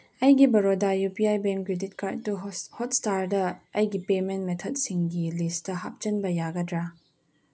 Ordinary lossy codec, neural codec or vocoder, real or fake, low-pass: none; none; real; none